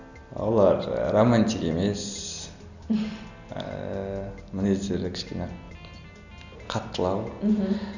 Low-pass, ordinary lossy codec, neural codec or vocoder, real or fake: 7.2 kHz; none; none; real